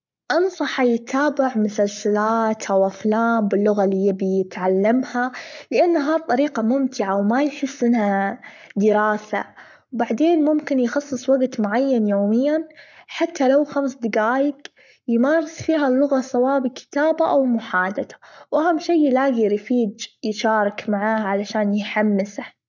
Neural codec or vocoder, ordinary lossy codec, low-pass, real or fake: codec, 44.1 kHz, 7.8 kbps, Pupu-Codec; none; 7.2 kHz; fake